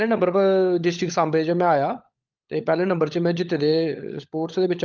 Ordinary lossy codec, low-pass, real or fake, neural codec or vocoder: Opus, 32 kbps; 7.2 kHz; fake; codec, 16 kHz, 16 kbps, FunCodec, trained on LibriTTS, 50 frames a second